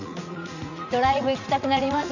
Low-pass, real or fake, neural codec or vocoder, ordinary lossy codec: 7.2 kHz; fake; vocoder, 44.1 kHz, 80 mel bands, Vocos; none